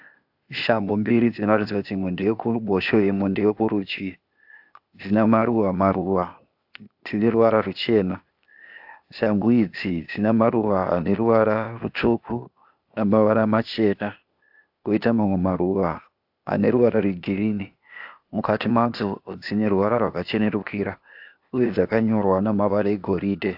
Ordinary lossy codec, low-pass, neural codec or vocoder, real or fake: AAC, 48 kbps; 5.4 kHz; codec, 16 kHz, 0.8 kbps, ZipCodec; fake